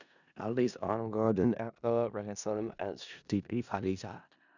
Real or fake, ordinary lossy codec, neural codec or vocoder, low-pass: fake; none; codec, 16 kHz in and 24 kHz out, 0.4 kbps, LongCat-Audio-Codec, four codebook decoder; 7.2 kHz